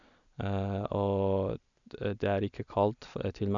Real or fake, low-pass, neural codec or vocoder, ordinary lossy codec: real; 7.2 kHz; none; none